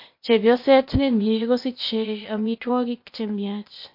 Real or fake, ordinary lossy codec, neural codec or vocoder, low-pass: fake; MP3, 32 kbps; codec, 16 kHz, 0.8 kbps, ZipCodec; 5.4 kHz